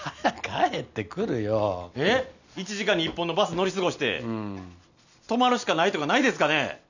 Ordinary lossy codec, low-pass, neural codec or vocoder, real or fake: none; 7.2 kHz; none; real